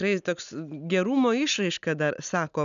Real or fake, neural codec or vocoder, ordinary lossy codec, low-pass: real; none; MP3, 96 kbps; 7.2 kHz